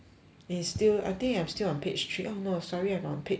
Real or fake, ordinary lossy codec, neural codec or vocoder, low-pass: real; none; none; none